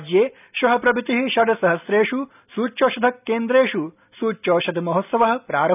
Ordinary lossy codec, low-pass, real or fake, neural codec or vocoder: none; 3.6 kHz; real; none